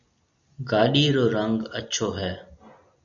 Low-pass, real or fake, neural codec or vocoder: 7.2 kHz; real; none